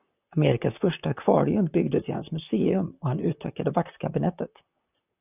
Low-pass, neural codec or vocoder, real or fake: 3.6 kHz; vocoder, 44.1 kHz, 128 mel bands, Pupu-Vocoder; fake